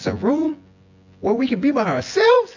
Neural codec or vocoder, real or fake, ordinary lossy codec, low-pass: vocoder, 24 kHz, 100 mel bands, Vocos; fake; AAC, 48 kbps; 7.2 kHz